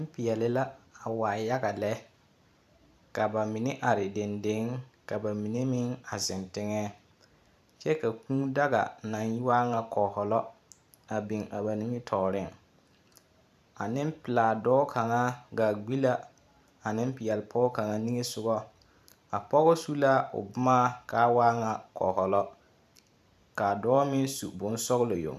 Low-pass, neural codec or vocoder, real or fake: 14.4 kHz; vocoder, 44.1 kHz, 128 mel bands every 512 samples, BigVGAN v2; fake